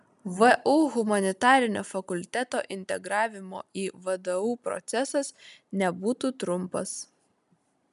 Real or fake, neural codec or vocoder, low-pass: real; none; 10.8 kHz